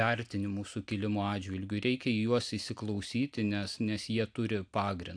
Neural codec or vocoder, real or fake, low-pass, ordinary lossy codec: none; real; 9.9 kHz; AAC, 64 kbps